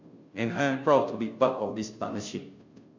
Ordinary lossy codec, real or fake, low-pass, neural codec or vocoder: MP3, 64 kbps; fake; 7.2 kHz; codec, 16 kHz, 0.5 kbps, FunCodec, trained on Chinese and English, 25 frames a second